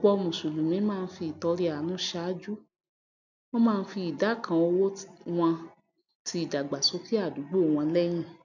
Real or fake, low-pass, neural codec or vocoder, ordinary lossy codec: real; 7.2 kHz; none; none